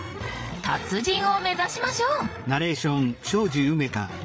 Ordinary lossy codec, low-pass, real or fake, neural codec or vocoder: none; none; fake; codec, 16 kHz, 8 kbps, FreqCodec, larger model